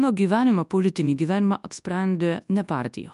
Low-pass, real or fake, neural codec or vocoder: 10.8 kHz; fake; codec, 24 kHz, 0.9 kbps, WavTokenizer, large speech release